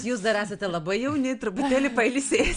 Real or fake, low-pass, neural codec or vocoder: real; 9.9 kHz; none